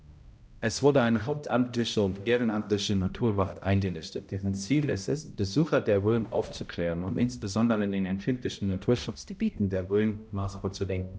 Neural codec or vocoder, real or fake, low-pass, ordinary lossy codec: codec, 16 kHz, 0.5 kbps, X-Codec, HuBERT features, trained on balanced general audio; fake; none; none